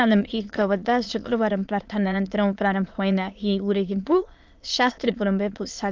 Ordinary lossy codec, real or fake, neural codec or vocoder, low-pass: Opus, 24 kbps; fake; autoencoder, 22.05 kHz, a latent of 192 numbers a frame, VITS, trained on many speakers; 7.2 kHz